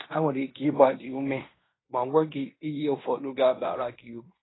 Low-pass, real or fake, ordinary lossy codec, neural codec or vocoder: 7.2 kHz; fake; AAC, 16 kbps; codec, 16 kHz in and 24 kHz out, 0.9 kbps, LongCat-Audio-Codec, fine tuned four codebook decoder